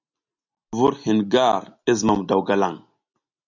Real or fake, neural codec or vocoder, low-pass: real; none; 7.2 kHz